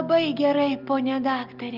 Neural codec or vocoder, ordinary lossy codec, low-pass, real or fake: none; Opus, 24 kbps; 5.4 kHz; real